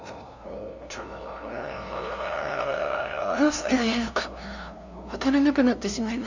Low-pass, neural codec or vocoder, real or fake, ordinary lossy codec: 7.2 kHz; codec, 16 kHz, 0.5 kbps, FunCodec, trained on LibriTTS, 25 frames a second; fake; none